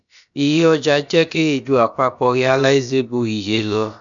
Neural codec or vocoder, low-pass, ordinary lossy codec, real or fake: codec, 16 kHz, about 1 kbps, DyCAST, with the encoder's durations; 7.2 kHz; none; fake